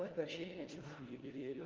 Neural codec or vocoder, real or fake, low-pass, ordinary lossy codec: codec, 16 kHz, 1 kbps, FunCodec, trained on LibriTTS, 50 frames a second; fake; 7.2 kHz; Opus, 16 kbps